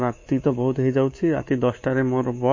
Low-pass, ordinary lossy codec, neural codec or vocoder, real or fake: 7.2 kHz; MP3, 32 kbps; codec, 24 kHz, 3.1 kbps, DualCodec; fake